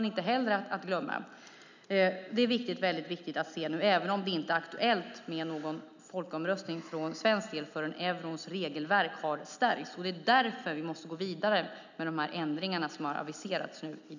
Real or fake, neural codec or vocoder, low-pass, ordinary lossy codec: real; none; 7.2 kHz; none